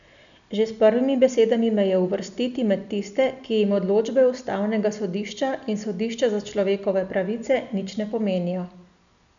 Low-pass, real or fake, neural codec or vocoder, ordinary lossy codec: 7.2 kHz; real; none; none